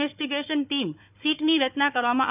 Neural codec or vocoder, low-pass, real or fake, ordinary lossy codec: codec, 16 kHz, 4 kbps, FunCodec, trained on Chinese and English, 50 frames a second; 3.6 kHz; fake; none